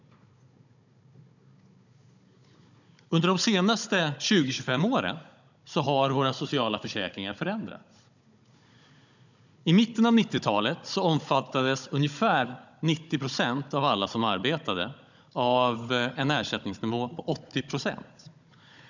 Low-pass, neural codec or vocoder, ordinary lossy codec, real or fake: 7.2 kHz; codec, 16 kHz, 16 kbps, FunCodec, trained on Chinese and English, 50 frames a second; none; fake